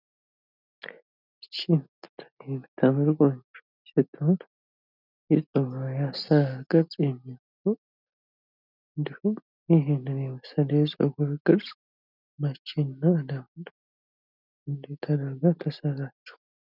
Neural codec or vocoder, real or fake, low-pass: none; real; 5.4 kHz